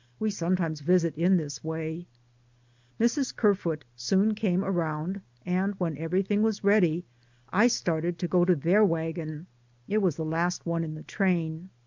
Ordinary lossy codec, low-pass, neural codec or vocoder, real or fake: MP3, 64 kbps; 7.2 kHz; none; real